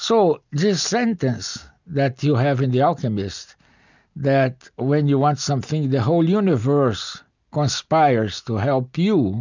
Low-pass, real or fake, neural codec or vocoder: 7.2 kHz; real; none